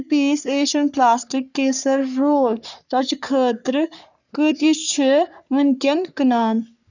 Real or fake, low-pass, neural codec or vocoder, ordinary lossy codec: fake; 7.2 kHz; codec, 44.1 kHz, 3.4 kbps, Pupu-Codec; none